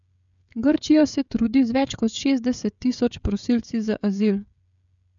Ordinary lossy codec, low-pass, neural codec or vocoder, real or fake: MP3, 96 kbps; 7.2 kHz; codec, 16 kHz, 16 kbps, FreqCodec, smaller model; fake